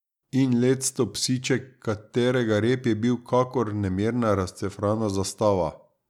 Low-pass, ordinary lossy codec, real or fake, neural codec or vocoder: 19.8 kHz; none; real; none